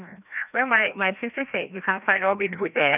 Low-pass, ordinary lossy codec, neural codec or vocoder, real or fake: 3.6 kHz; none; codec, 16 kHz, 1 kbps, FreqCodec, larger model; fake